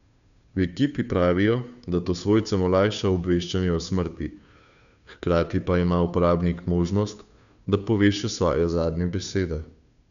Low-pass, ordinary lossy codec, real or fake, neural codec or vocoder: 7.2 kHz; none; fake; codec, 16 kHz, 2 kbps, FunCodec, trained on Chinese and English, 25 frames a second